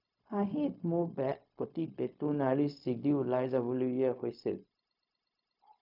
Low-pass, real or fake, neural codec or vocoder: 5.4 kHz; fake; codec, 16 kHz, 0.4 kbps, LongCat-Audio-Codec